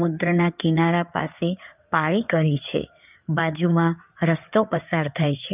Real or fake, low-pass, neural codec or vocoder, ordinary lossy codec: fake; 3.6 kHz; codec, 16 kHz in and 24 kHz out, 2.2 kbps, FireRedTTS-2 codec; none